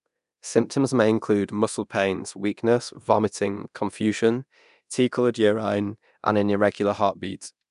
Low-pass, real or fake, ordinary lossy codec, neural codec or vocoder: 10.8 kHz; fake; none; codec, 24 kHz, 0.9 kbps, DualCodec